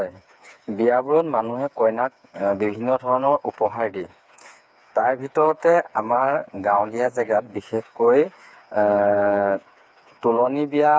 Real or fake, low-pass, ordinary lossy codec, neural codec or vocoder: fake; none; none; codec, 16 kHz, 4 kbps, FreqCodec, smaller model